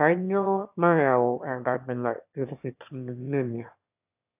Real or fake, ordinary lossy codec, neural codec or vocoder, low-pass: fake; AAC, 32 kbps; autoencoder, 22.05 kHz, a latent of 192 numbers a frame, VITS, trained on one speaker; 3.6 kHz